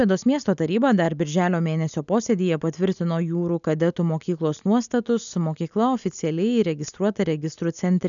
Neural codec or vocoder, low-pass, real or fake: none; 7.2 kHz; real